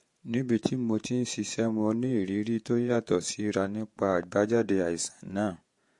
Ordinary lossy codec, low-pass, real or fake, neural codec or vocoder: MP3, 48 kbps; 10.8 kHz; fake; vocoder, 48 kHz, 128 mel bands, Vocos